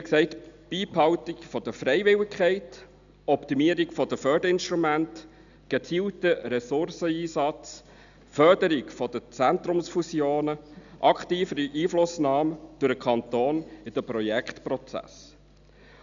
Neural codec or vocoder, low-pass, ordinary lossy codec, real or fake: none; 7.2 kHz; none; real